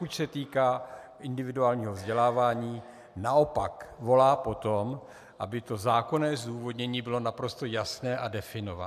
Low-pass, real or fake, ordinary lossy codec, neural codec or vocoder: 14.4 kHz; real; AAC, 96 kbps; none